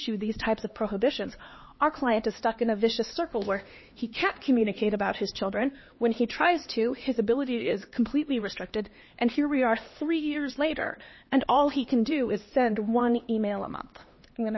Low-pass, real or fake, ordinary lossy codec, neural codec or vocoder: 7.2 kHz; fake; MP3, 24 kbps; codec, 16 kHz, 2 kbps, X-Codec, HuBERT features, trained on LibriSpeech